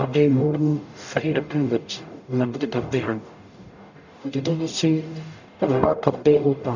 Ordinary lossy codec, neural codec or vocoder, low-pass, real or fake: none; codec, 44.1 kHz, 0.9 kbps, DAC; 7.2 kHz; fake